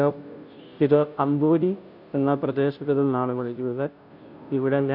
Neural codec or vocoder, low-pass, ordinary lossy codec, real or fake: codec, 16 kHz, 0.5 kbps, FunCodec, trained on Chinese and English, 25 frames a second; 5.4 kHz; none; fake